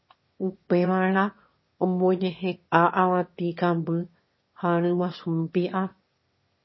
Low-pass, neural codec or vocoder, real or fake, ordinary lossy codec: 7.2 kHz; autoencoder, 22.05 kHz, a latent of 192 numbers a frame, VITS, trained on one speaker; fake; MP3, 24 kbps